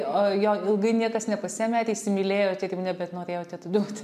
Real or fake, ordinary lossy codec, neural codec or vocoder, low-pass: real; MP3, 96 kbps; none; 14.4 kHz